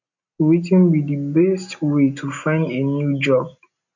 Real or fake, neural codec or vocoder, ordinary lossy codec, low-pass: real; none; none; 7.2 kHz